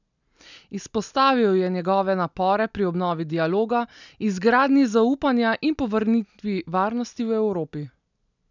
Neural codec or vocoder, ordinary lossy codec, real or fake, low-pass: none; none; real; 7.2 kHz